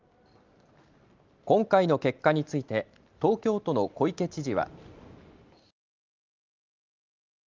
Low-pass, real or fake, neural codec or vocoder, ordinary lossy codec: 7.2 kHz; real; none; Opus, 24 kbps